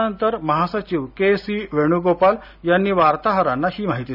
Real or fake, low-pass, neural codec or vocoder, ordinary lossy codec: real; 5.4 kHz; none; none